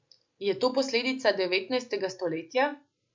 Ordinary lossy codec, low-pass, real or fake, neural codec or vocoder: MP3, 64 kbps; 7.2 kHz; real; none